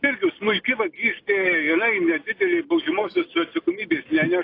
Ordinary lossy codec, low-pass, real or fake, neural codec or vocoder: AAC, 32 kbps; 5.4 kHz; real; none